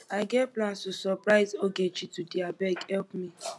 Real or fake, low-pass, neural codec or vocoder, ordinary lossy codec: real; none; none; none